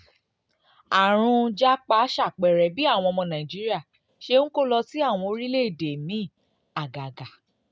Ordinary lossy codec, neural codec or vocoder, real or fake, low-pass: none; none; real; none